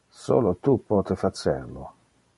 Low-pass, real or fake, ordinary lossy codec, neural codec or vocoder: 14.4 kHz; real; MP3, 48 kbps; none